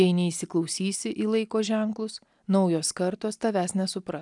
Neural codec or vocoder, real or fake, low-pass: none; real; 10.8 kHz